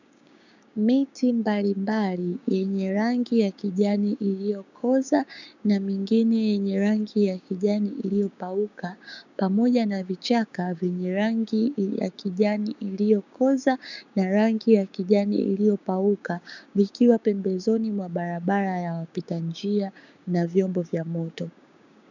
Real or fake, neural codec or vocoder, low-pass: fake; codec, 16 kHz, 6 kbps, DAC; 7.2 kHz